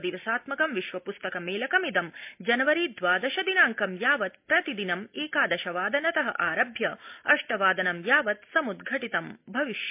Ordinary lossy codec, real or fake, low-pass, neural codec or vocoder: MP3, 32 kbps; real; 3.6 kHz; none